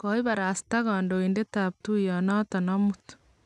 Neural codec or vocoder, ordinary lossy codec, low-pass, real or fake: none; none; none; real